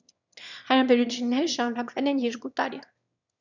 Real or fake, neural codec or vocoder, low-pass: fake; autoencoder, 22.05 kHz, a latent of 192 numbers a frame, VITS, trained on one speaker; 7.2 kHz